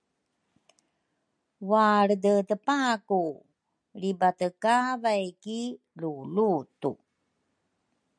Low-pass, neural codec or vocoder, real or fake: 9.9 kHz; none; real